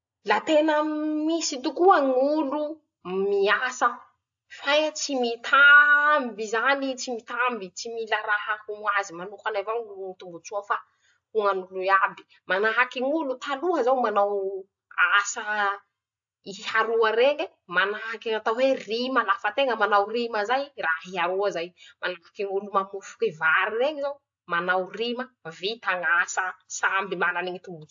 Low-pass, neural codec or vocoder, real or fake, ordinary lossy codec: 7.2 kHz; none; real; AAC, 64 kbps